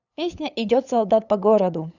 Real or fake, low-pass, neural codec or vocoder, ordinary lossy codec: fake; 7.2 kHz; codec, 16 kHz, 8 kbps, FunCodec, trained on LibriTTS, 25 frames a second; none